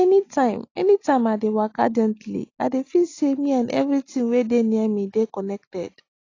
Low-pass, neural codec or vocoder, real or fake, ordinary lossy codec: 7.2 kHz; none; real; AAC, 32 kbps